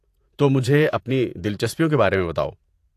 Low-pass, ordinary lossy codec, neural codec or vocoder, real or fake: 14.4 kHz; AAC, 64 kbps; vocoder, 48 kHz, 128 mel bands, Vocos; fake